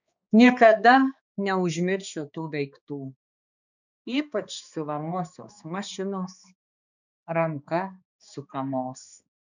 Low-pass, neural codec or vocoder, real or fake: 7.2 kHz; codec, 16 kHz, 2 kbps, X-Codec, HuBERT features, trained on balanced general audio; fake